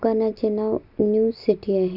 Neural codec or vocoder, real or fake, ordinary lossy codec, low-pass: none; real; none; 5.4 kHz